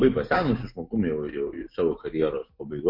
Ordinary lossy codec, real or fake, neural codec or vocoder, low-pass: MP3, 32 kbps; real; none; 5.4 kHz